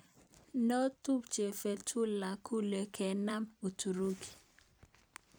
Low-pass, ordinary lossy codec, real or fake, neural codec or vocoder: none; none; fake; vocoder, 44.1 kHz, 128 mel bands every 256 samples, BigVGAN v2